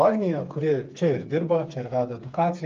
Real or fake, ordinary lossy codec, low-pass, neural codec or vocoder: fake; Opus, 24 kbps; 7.2 kHz; codec, 16 kHz, 4 kbps, FreqCodec, smaller model